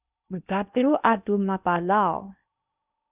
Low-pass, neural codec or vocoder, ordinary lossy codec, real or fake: 3.6 kHz; codec, 16 kHz in and 24 kHz out, 0.6 kbps, FocalCodec, streaming, 2048 codes; Opus, 64 kbps; fake